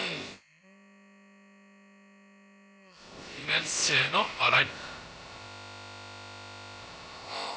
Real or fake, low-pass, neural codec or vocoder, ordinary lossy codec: fake; none; codec, 16 kHz, about 1 kbps, DyCAST, with the encoder's durations; none